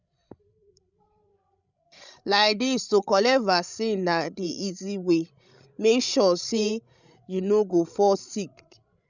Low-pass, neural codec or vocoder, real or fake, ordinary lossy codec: 7.2 kHz; codec, 16 kHz, 16 kbps, FreqCodec, larger model; fake; none